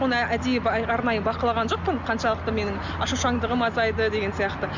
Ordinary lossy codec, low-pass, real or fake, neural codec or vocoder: none; 7.2 kHz; real; none